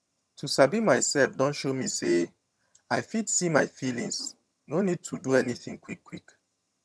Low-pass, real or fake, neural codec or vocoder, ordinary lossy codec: none; fake; vocoder, 22.05 kHz, 80 mel bands, HiFi-GAN; none